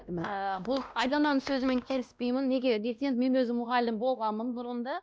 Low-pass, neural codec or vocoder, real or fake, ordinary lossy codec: none; codec, 16 kHz, 1 kbps, X-Codec, WavLM features, trained on Multilingual LibriSpeech; fake; none